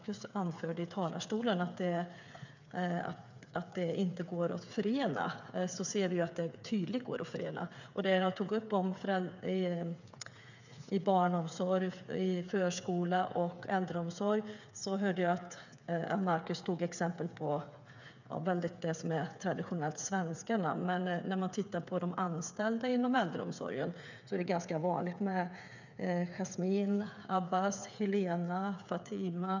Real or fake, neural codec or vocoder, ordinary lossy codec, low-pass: fake; codec, 16 kHz, 8 kbps, FreqCodec, smaller model; none; 7.2 kHz